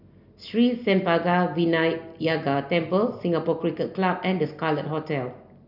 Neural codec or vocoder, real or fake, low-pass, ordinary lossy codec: none; real; 5.4 kHz; none